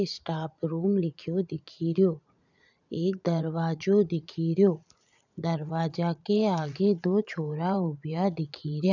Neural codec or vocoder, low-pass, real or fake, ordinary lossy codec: vocoder, 44.1 kHz, 80 mel bands, Vocos; 7.2 kHz; fake; none